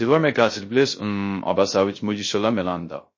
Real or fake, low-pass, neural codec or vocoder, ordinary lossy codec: fake; 7.2 kHz; codec, 16 kHz, 0.3 kbps, FocalCodec; MP3, 32 kbps